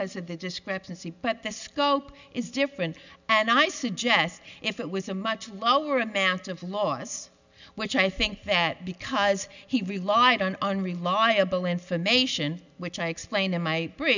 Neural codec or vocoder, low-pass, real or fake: none; 7.2 kHz; real